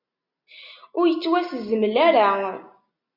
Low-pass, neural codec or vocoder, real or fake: 5.4 kHz; none; real